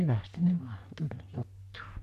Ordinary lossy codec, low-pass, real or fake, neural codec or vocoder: none; 14.4 kHz; fake; codec, 44.1 kHz, 3.4 kbps, Pupu-Codec